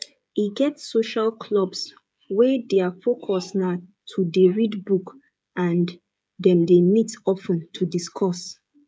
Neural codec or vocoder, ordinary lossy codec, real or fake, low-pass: codec, 16 kHz, 16 kbps, FreqCodec, smaller model; none; fake; none